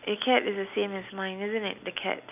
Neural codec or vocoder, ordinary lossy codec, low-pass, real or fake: none; none; 3.6 kHz; real